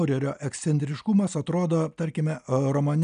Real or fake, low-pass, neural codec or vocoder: real; 9.9 kHz; none